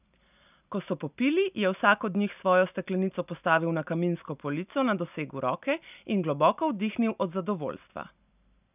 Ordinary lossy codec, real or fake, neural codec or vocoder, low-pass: none; real; none; 3.6 kHz